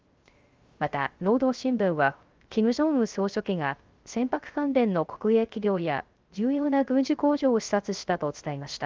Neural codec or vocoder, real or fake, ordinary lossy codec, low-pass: codec, 16 kHz, 0.3 kbps, FocalCodec; fake; Opus, 32 kbps; 7.2 kHz